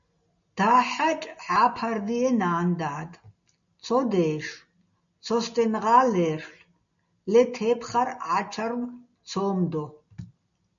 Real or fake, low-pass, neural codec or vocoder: real; 7.2 kHz; none